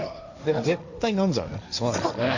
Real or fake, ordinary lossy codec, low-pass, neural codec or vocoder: fake; none; 7.2 kHz; codec, 16 kHz, 1.1 kbps, Voila-Tokenizer